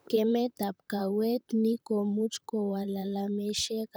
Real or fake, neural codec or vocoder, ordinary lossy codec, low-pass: fake; vocoder, 44.1 kHz, 128 mel bands, Pupu-Vocoder; none; none